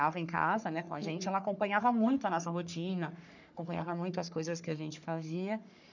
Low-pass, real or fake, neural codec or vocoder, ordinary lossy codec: 7.2 kHz; fake; codec, 44.1 kHz, 3.4 kbps, Pupu-Codec; none